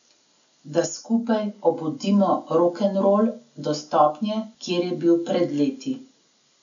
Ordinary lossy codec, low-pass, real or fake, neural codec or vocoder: none; 7.2 kHz; real; none